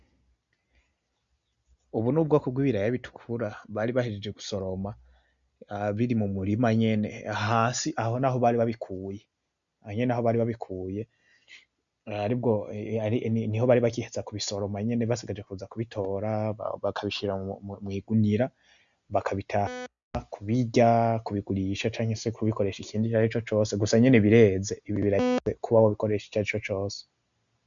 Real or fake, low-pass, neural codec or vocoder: real; 7.2 kHz; none